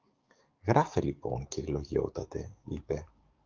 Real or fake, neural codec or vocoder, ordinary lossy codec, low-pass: fake; codec, 44.1 kHz, 7.8 kbps, DAC; Opus, 32 kbps; 7.2 kHz